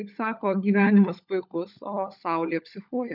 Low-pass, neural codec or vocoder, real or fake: 5.4 kHz; codec, 16 kHz, 16 kbps, FunCodec, trained on LibriTTS, 50 frames a second; fake